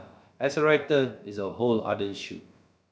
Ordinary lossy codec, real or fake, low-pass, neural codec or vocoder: none; fake; none; codec, 16 kHz, about 1 kbps, DyCAST, with the encoder's durations